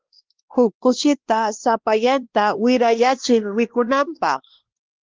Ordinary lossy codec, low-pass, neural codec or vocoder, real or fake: Opus, 16 kbps; 7.2 kHz; codec, 16 kHz, 1 kbps, X-Codec, WavLM features, trained on Multilingual LibriSpeech; fake